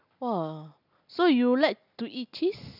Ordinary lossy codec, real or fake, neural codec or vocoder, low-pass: none; real; none; 5.4 kHz